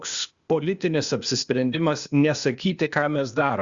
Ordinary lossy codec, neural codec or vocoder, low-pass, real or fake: Opus, 64 kbps; codec, 16 kHz, 0.8 kbps, ZipCodec; 7.2 kHz; fake